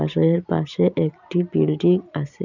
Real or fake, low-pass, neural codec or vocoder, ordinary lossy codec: real; 7.2 kHz; none; none